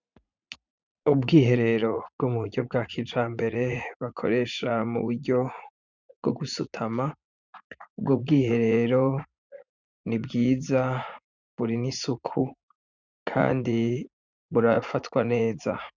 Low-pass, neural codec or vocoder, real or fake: 7.2 kHz; vocoder, 44.1 kHz, 80 mel bands, Vocos; fake